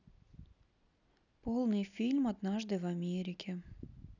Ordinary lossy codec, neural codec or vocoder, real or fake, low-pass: none; none; real; 7.2 kHz